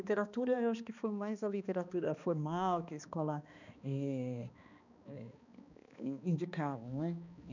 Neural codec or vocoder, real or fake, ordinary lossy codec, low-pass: codec, 16 kHz, 2 kbps, X-Codec, HuBERT features, trained on balanced general audio; fake; none; 7.2 kHz